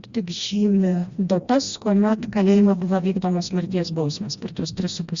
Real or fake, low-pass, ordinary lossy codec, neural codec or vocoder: fake; 7.2 kHz; Opus, 64 kbps; codec, 16 kHz, 1 kbps, FreqCodec, smaller model